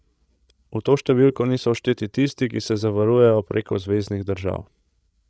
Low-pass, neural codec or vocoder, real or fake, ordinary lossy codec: none; codec, 16 kHz, 16 kbps, FreqCodec, larger model; fake; none